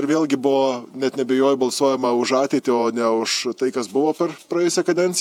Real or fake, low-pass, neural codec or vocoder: fake; 19.8 kHz; vocoder, 48 kHz, 128 mel bands, Vocos